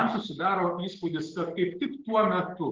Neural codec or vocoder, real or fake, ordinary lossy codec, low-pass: none; real; Opus, 16 kbps; 7.2 kHz